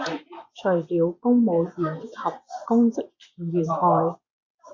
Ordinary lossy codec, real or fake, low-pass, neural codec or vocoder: MP3, 48 kbps; fake; 7.2 kHz; vocoder, 24 kHz, 100 mel bands, Vocos